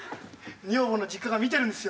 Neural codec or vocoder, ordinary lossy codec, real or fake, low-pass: none; none; real; none